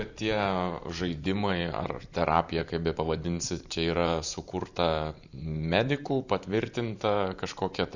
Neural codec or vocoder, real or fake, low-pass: vocoder, 44.1 kHz, 128 mel bands every 512 samples, BigVGAN v2; fake; 7.2 kHz